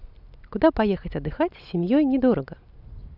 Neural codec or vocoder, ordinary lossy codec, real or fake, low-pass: none; none; real; 5.4 kHz